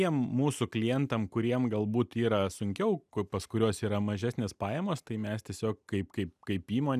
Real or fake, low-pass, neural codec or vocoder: real; 14.4 kHz; none